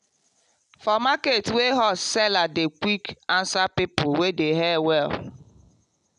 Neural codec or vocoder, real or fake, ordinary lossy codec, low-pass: none; real; none; 10.8 kHz